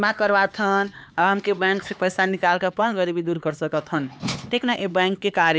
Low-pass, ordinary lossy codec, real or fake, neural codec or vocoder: none; none; fake; codec, 16 kHz, 4 kbps, X-Codec, HuBERT features, trained on LibriSpeech